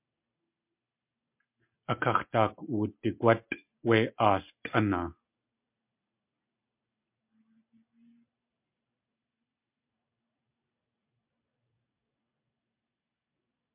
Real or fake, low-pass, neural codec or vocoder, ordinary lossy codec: real; 3.6 kHz; none; MP3, 32 kbps